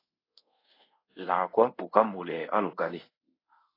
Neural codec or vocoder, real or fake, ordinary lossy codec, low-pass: codec, 24 kHz, 0.5 kbps, DualCodec; fake; AAC, 24 kbps; 5.4 kHz